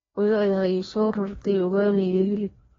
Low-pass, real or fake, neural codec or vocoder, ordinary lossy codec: 7.2 kHz; fake; codec, 16 kHz, 1 kbps, FreqCodec, larger model; AAC, 24 kbps